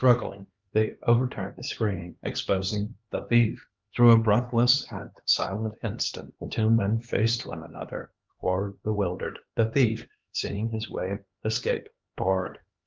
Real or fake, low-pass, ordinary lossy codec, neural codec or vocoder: fake; 7.2 kHz; Opus, 32 kbps; codec, 16 kHz, 2 kbps, X-Codec, HuBERT features, trained on LibriSpeech